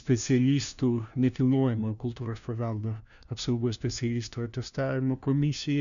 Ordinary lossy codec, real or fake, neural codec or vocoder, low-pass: MP3, 96 kbps; fake; codec, 16 kHz, 1 kbps, FunCodec, trained on LibriTTS, 50 frames a second; 7.2 kHz